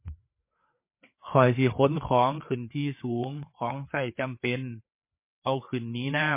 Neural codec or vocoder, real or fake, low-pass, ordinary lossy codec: codec, 16 kHz, 4 kbps, FreqCodec, larger model; fake; 3.6 kHz; MP3, 24 kbps